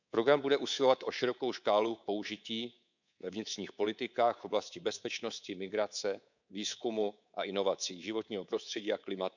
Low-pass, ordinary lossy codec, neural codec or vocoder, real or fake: 7.2 kHz; none; codec, 24 kHz, 3.1 kbps, DualCodec; fake